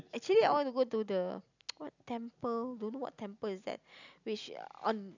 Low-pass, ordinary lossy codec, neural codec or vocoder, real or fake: 7.2 kHz; none; none; real